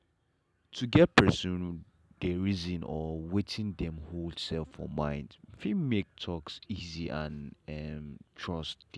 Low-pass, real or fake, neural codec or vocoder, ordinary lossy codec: none; real; none; none